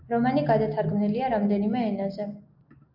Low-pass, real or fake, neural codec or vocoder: 5.4 kHz; real; none